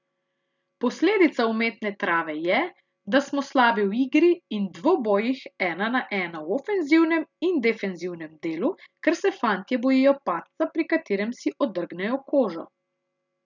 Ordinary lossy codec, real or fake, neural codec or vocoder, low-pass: none; real; none; 7.2 kHz